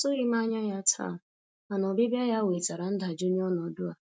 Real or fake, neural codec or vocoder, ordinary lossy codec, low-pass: real; none; none; none